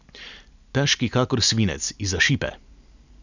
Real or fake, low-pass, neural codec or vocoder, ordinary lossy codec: real; 7.2 kHz; none; none